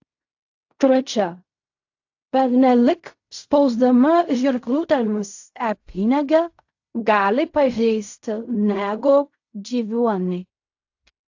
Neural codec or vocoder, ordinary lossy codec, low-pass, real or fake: codec, 16 kHz in and 24 kHz out, 0.4 kbps, LongCat-Audio-Codec, fine tuned four codebook decoder; AAC, 48 kbps; 7.2 kHz; fake